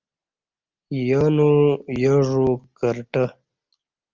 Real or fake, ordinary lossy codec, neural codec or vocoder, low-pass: real; Opus, 32 kbps; none; 7.2 kHz